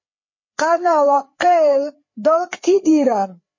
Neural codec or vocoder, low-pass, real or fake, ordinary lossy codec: codec, 16 kHz, 4 kbps, FreqCodec, larger model; 7.2 kHz; fake; MP3, 32 kbps